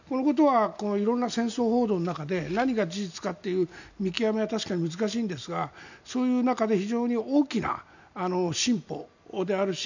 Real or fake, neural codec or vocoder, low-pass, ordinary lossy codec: real; none; 7.2 kHz; none